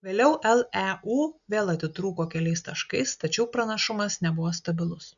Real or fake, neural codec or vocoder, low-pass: real; none; 7.2 kHz